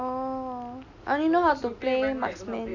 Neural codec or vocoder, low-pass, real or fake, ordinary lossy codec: none; 7.2 kHz; real; none